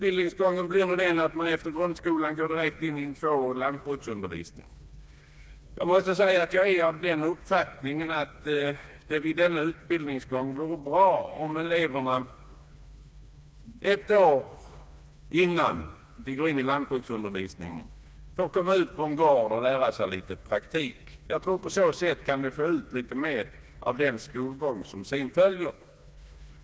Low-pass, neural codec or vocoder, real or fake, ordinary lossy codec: none; codec, 16 kHz, 2 kbps, FreqCodec, smaller model; fake; none